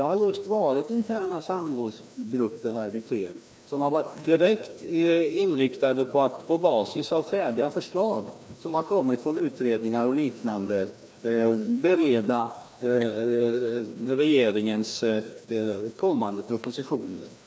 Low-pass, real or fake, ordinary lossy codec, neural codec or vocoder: none; fake; none; codec, 16 kHz, 1 kbps, FreqCodec, larger model